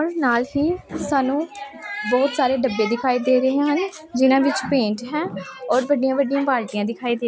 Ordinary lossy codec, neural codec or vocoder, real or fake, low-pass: none; none; real; none